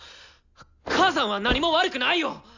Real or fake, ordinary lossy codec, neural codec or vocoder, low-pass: real; none; none; 7.2 kHz